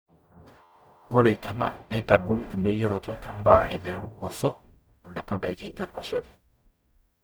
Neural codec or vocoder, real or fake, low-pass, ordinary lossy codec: codec, 44.1 kHz, 0.9 kbps, DAC; fake; none; none